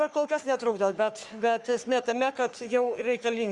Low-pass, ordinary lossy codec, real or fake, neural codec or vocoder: 10.8 kHz; Opus, 64 kbps; fake; codec, 44.1 kHz, 3.4 kbps, Pupu-Codec